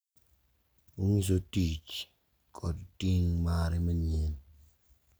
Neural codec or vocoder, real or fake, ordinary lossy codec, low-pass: none; real; none; none